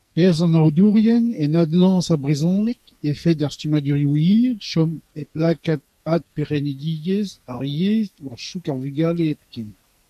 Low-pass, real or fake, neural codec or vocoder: 14.4 kHz; fake; codec, 44.1 kHz, 2.6 kbps, SNAC